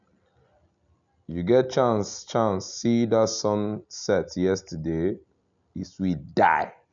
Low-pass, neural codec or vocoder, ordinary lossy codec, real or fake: 7.2 kHz; none; none; real